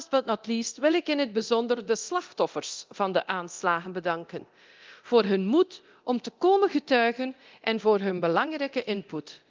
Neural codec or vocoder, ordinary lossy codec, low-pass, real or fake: codec, 24 kHz, 0.9 kbps, DualCodec; Opus, 32 kbps; 7.2 kHz; fake